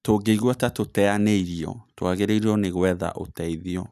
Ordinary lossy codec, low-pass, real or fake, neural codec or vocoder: none; 14.4 kHz; real; none